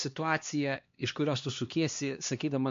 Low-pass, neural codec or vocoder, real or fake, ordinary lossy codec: 7.2 kHz; codec, 16 kHz, 2 kbps, X-Codec, HuBERT features, trained on LibriSpeech; fake; MP3, 48 kbps